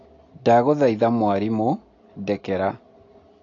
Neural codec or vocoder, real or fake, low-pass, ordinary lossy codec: none; real; 7.2 kHz; AAC, 32 kbps